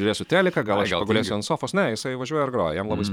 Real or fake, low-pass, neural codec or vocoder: fake; 19.8 kHz; vocoder, 44.1 kHz, 128 mel bands every 512 samples, BigVGAN v2